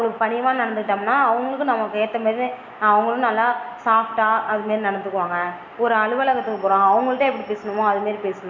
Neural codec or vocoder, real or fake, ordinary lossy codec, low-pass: none; real; none; 7.2 kHz